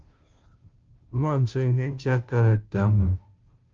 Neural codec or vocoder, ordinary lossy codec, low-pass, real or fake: codec, 16 kHz, 0.5 kbps, FunCodec, trained on Chinese and English, 25 frames a second; Opus, 24 kbps; 7.2 kHz; fake